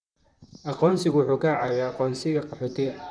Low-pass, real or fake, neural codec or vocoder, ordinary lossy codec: 9.9 kHz; fake; vocoder, 44.1 kHz, 128 mel bands, Pupu-Vocoder; none